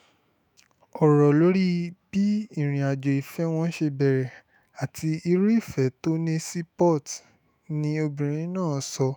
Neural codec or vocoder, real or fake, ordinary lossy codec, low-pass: autoencoder, 48 kHz, 128 numbers a frame, DAC-VAE, trained on Japanese speech; fake; none; none